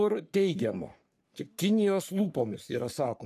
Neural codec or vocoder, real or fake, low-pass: codec, 44.1 kHz, 3.4 kbps, Pupu-Codec; fake; 14.4 kHz